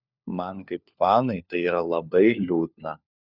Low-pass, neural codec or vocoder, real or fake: 5.4 kHz; codec, 16 kHz, 4 kbps, FunCodec, trained on LibriTTS, 50 frames a second; fake